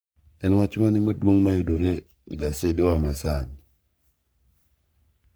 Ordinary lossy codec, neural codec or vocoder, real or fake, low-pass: none; codec, 44.1 kHz, 3.4 kbps, Pupu-Codec; fake; none